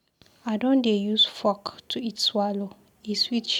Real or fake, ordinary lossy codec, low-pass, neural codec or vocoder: real; none; 19.8 kHz; none